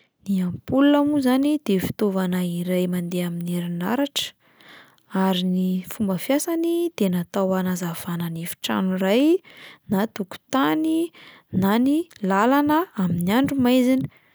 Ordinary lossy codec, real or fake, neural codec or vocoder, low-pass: none; real; none; none